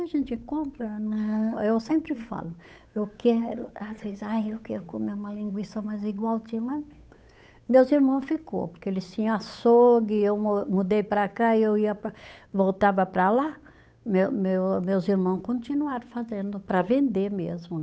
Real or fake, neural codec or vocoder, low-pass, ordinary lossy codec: fake; codec, 16 kHz, 8 kbps, FunCodec, trained on Chinese and English, 25 frames a second; none; none